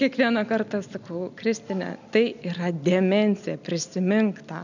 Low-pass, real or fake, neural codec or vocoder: 7.2 kHz; real; none